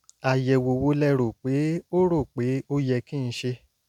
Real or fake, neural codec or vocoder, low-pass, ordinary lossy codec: real; none; 19.8 kHz; none